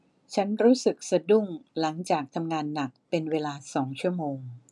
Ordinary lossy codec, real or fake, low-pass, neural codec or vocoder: none; real; none; none